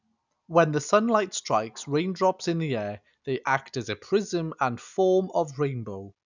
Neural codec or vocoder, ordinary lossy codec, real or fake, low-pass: none; none; real; 7.2 kHz